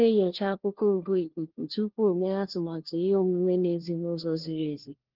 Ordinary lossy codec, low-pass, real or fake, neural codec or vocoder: Opus, 16 kbps; 5.4 kHz; fake; codec, 16 kHz, 1 kbps, FunCodec, trained on LibriTTS, 50 frames a second